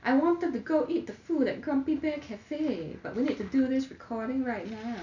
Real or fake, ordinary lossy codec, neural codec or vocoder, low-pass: real; none; none; 7.2 kHz